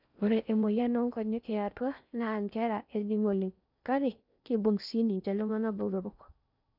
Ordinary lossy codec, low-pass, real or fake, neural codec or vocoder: none; 5.4 kHz; fake; codec, 16 kHz in and 24 kHz out, 0.6 kbps, FocalCodec, streaming, 4096 codes